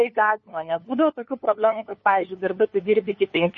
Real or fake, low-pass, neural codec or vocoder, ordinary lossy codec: fake; 7.2 kHz; codec, 16 kHz, 2 kbps, FunCodec, trained on LibriTTS, 25 frames a second; MP3, 32 kbps